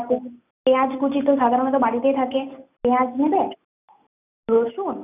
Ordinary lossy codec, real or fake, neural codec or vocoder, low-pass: none; real; none; 3.6 kHz